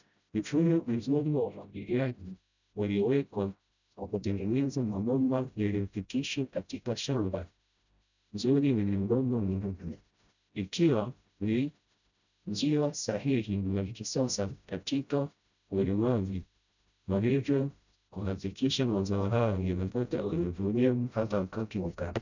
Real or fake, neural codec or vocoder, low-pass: fake; codec, 16 kHz, 0.5 kbps, FreqCodec, smaller model; 7.2 kHz